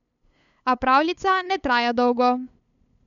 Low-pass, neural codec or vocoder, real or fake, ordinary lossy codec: 7.2 kHz; codec, 16 kHz, 8 kbps, FunCodec, trained on LibriTTS, 25 frames a second; fake; none